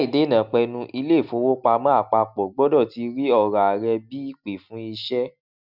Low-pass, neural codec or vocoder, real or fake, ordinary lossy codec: 5.4 kHz; none; real; none